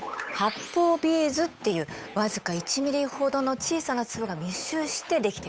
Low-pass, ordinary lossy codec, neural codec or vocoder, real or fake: none; none; codec, 16 kHz, 8 kbps, FunCodec, trained on Chinese and English, 25 frames a second; fake